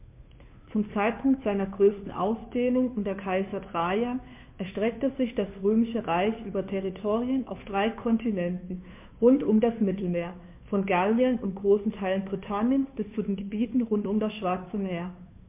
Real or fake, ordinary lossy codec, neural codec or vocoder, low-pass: fake; MP3, 32 kbps; codec, 16 kHz, 2 kbps, FunCodec, trained on Chinese and English, 25 frames a second; 3.6 kHz